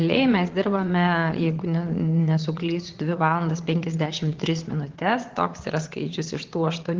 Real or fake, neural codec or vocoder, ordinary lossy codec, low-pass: fake; vocoder, 22.05 kHz, 80 mel bands, Vocos; Opus, 16 kbps; 7.2 kHz